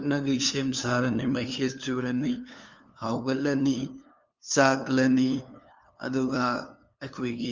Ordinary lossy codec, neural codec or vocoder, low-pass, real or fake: Opus, 32 kbps; codec, 16 kHz, 2 kbps, FunCodec, trained on LibriTTS, 25 frames a second; 7.2 kHz; fake